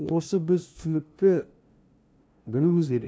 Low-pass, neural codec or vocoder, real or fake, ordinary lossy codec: none; codec, 16 kHz, 0.5 kbps, FunCodec, trained on LibriTTS, 25 frames a second; fake; none